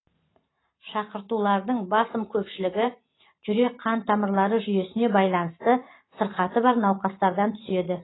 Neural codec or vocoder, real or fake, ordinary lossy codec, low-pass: vocoder, 44.1 kHz, 128 mel bands every 512 samples, BigVGAN v2; fake; AAC, 16 kbps; 7.2 kHz